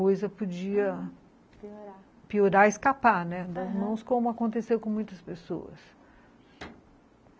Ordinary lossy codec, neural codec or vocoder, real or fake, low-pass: none; none; real; none